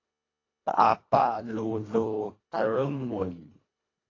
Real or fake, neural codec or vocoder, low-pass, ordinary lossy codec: fake; codec, 24 kHz, 1.5 kbps, HILCodec; 7.2 kHz; AAC, 32 kbps